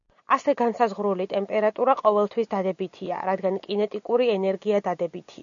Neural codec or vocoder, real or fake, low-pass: none; real; 7.2 kHz